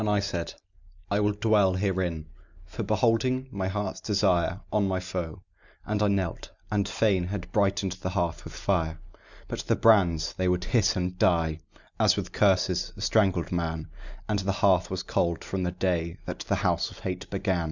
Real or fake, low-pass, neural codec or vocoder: real; 7.2 kHz; none